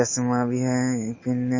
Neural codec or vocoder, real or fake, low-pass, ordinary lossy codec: none; real; 7.2 kHz; MP3, 32 kbps